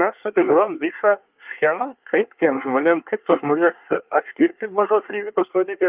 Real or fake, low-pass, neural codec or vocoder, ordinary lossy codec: fake; 3.6 kHz; codec, 24 kHz, 1 kbps, SNAC; Opus, 24 kbps